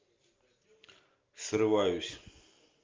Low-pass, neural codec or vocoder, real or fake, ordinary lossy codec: 7.2 kHz; none; real; Opus, 24 kbps